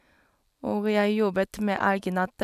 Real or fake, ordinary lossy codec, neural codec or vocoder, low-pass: real; none; none; 14.4 kHz